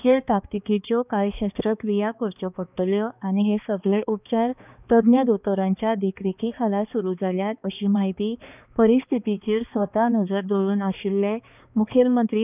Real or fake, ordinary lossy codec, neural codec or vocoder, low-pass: fake; none; codec, 16 kHz, 2 kbps, X-Codec, HuBERT features, trained on balanced general audio; 3.6 kHz